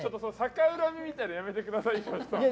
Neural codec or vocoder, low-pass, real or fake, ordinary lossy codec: none; none; real; none